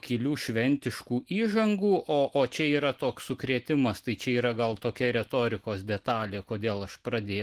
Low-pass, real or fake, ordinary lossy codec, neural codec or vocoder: 14.4 kHz; fake; Opus, 16 kbps; autoencoder, 48 kHz, 128 numbers a frame, DAC-VAE, trained on Japanese speech